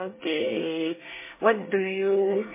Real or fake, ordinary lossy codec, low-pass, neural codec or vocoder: fake; MP3, 16 kbps; 3.6 kHz; codec, 24 kHz, 1 kbps, SNAC